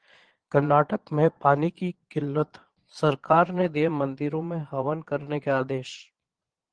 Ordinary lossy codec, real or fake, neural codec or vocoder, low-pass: Opus, 16 kbps; fake; vocoder, 22.05 kHz, 80 mel bands, Vocos; 9.9 kHz